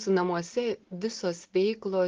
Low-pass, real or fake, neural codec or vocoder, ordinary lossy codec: 7.2 kHz; real; none; Opus, 16 kbps